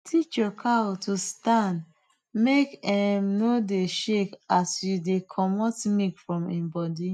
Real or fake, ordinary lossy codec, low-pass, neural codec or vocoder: real; none; none; none